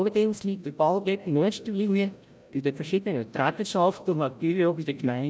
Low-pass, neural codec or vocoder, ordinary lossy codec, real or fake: none; codec, 16 kHz, 0.5 kbps, FreqCodec, larger model; none; fake